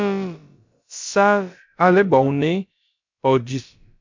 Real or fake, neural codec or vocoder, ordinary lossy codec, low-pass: fake; codec, 16 kHz, about 1 kbps, DyCAST, with the encoder's durations; MP3, 64 kbps; 7.2 kHz